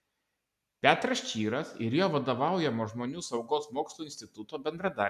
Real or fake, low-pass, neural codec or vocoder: real; 14.4 kHz; none